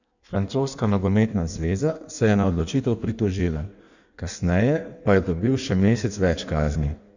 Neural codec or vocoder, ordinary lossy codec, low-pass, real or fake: codec, 16 kHz in and 24 kHz out, 1.1 kbps, FireRedTTS-2 codec; none; 7.2 kHz; fake